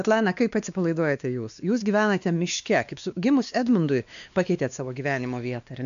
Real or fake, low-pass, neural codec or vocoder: fake; 7.2 kHz; codec, 16 kHz, 2 kbps, X-Codec, WavLM features, trained on Multilingual LibriSpeech